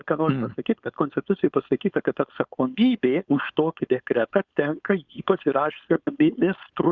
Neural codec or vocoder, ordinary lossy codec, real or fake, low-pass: codec, 16 kHz, 4.8 kbps, FACodec; Opus, 64 kbps; fake; 7.2 kHz